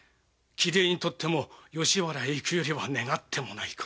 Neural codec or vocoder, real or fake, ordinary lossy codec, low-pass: none; real; none; none